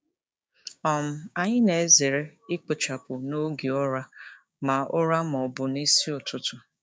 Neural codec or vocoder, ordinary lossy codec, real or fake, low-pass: codec, 16 kHz, 6 kbps, DAC; none; fake; none